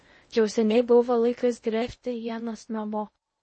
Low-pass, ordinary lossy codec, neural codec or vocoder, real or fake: 9.9 kHz; MP3, 32 kbps; codec, 16 kHz in and 24 kHz out, 0.6 kbps, FocalCodec, streaming, 2048 codes; fake